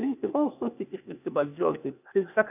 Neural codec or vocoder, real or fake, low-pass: codec, 16 kHz, 0.8 kbps, ZipCodec; fake; 3.6 kHz